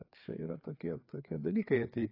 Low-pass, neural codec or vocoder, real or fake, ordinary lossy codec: 5.4 kHz; codec, 16 kHz, 4 kbps, FreqCodec, larger model; fake; AAC, 24 kbps